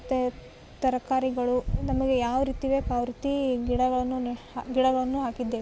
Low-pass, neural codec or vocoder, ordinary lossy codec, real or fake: none; none; none; real